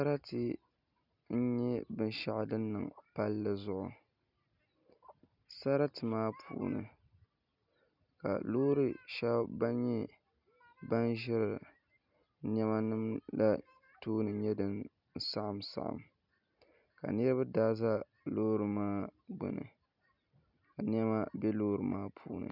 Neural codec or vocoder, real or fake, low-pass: none; real; 5.4 kHz